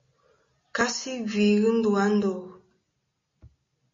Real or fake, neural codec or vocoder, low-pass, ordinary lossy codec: real; none; 7.2 kHz; MP3, 32 kbps